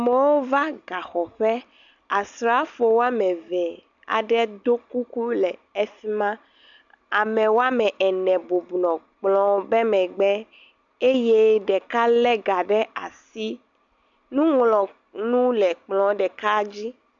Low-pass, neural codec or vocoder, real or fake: 7.2 kHz; none; real